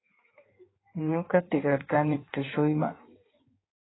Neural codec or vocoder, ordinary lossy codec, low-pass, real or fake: codec, 16 kHz in and 24 kHz out, 1.1 kbps, FireRedTTS-2 codec; AAC, 16 kbps; 7.2 kHz; fake